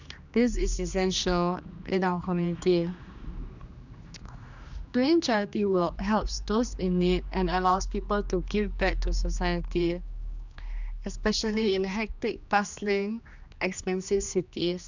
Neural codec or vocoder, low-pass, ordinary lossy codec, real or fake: codec, 16 kHz, 2 kbps, X-Codec, HuBERT features, trained on general audio; 7.2 kHz; none; fake